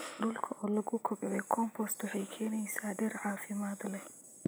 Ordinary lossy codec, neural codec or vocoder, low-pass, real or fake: none; none; none; real